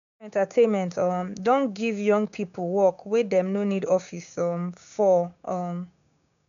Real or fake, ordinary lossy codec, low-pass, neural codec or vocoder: fake; none; 7.2 kHz; codec, 16 kHz, 6 kbps, DAC